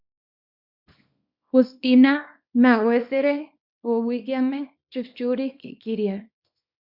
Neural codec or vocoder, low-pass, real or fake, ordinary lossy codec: codec, 24 kHz, 0.9 kbps, WavTokenizer, small release; 5.4 kHz; fake; Opus, 64 kbps